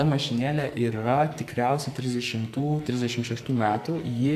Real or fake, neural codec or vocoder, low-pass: fake; codec, 44.1 kHz, 2.6 kbps, SNAC; 14.4 kHz